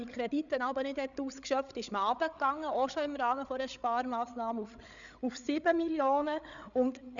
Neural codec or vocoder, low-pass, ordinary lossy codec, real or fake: codec, 16 kHz, 8 kbps, FreqCodec, larger model; 7.2 kHz; none; fake